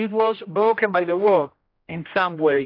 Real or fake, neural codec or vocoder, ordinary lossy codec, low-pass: fake; codec, 16 kHz, 1 kbps, X-Codec, HuBERT features, trained on general audio; AAC, 32 kbps; 5.4 kHz